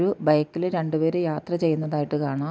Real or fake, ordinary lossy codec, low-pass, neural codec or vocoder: real; none; none; none